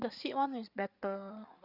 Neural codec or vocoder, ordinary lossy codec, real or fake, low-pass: codec, 16 kHz, 8 kbps, FreqCodec, larger model; Opus, 64 kbps; fake; 5.4 kHz